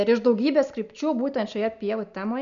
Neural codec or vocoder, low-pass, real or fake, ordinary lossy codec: none; 7.2 kHz; real; MP3, 96 kbps